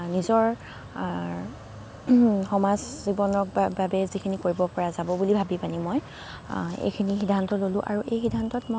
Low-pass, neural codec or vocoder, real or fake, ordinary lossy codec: none; none; real; none